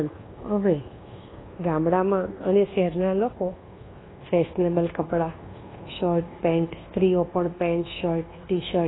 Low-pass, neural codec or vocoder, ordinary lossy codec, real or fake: 7.2 kHz; codec, 24 kHz, 1.2 kbps, DualCodec; AAC, 16 kbps; fake